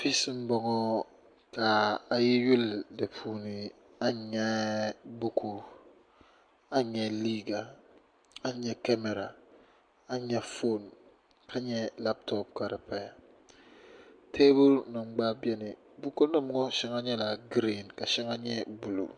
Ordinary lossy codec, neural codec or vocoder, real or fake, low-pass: MP3, 64 kbps; none; real; 9.9 kHz